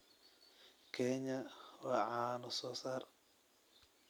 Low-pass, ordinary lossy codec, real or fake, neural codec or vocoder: none; none; real; none